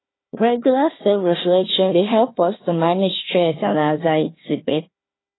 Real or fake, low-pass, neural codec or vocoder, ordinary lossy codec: fake; 7.2 kHz; codec, 16 kHz, 1 kbps, FunCodec, trained on Chinese and English, 50 frames a second; AAC, 16 kbps